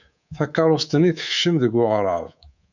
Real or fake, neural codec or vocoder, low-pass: fake; codec, 24 kHz, 3.1 kbps, DualCodec; 7.2 kHz